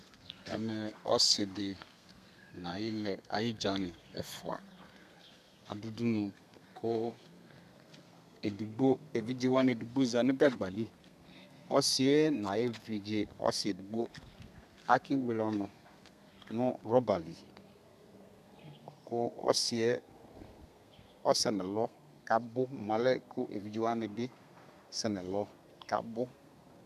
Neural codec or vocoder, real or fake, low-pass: codec, 32 kHz, 1.9 kbps, SNAC; fake; 14.4 kHz